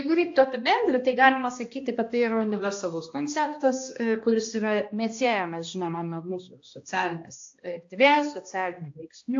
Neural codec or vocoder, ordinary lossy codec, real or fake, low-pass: codec, 16 kHz, 1 kbps, X-Codec, HuBERT features, trained on balanced general audio; AAC, 48 kbps; fake; 7.2 kHz